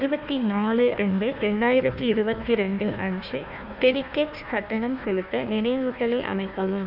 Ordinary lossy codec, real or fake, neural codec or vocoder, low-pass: none; fake; codec, 16 kHz, 1 kbps, FunCodec, trained on Chinese and English, 50 frames a second; 5.4 kHz